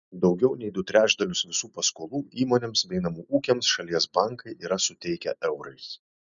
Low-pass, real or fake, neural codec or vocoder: 7.2 kHz; real; none